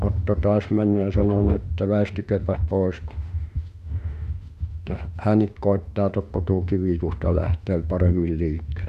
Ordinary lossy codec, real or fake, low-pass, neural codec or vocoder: none; fake; 14.4 kHz; autoencoder, 48 kHz, 32 numbers a frame, DAC-VAE, trained on Japanese speech